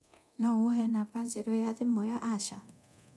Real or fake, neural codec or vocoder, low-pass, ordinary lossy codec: fake; codec, 24 kHz, 0.9 kbps, DualCodec; none; none